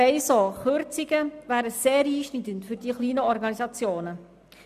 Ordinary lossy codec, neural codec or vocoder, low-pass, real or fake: none; none; 14.4 kHz; real